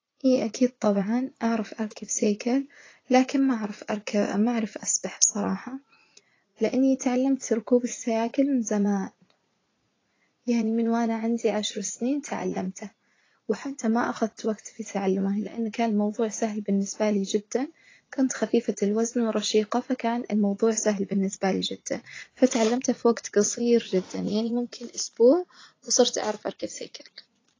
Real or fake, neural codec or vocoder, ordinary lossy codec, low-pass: fake; vocoder, 44.1 kHz, 80 mel bands, Vocos; AAC, 32 kbps; 7.2 kHz